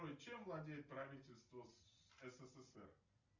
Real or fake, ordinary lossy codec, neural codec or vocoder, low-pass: real; Opus, 64 kbps; none; 7.2 kHz